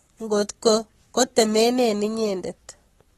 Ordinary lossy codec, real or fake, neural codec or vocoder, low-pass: AAC, 32 kbps; fake; codec, 44.1 kHz, 7.8 kbps, Pupu-Codec; 19.8 kHz